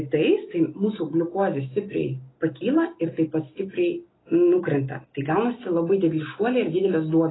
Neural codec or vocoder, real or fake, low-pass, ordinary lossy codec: none; real; 7.2 kHz; AAC, 16 kbps